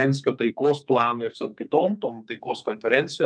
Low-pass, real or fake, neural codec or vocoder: 9.9 kHz; fake; codec, 32 kHz, 1.9 kbps, SNAC